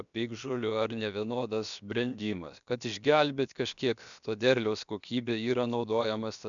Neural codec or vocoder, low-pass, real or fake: codec, 16 kHz, about 1 kbps, DyCAST, with the encoder's durations; 7.2 kHz; fake